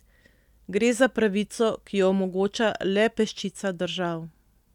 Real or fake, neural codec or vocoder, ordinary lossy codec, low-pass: real; none; none; 19.8 kHz